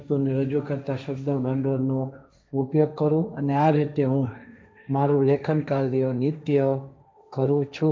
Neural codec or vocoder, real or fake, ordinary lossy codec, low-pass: codec, 16 kHz, 1.1 kbps, Voila-Tokenizer; fake; none; none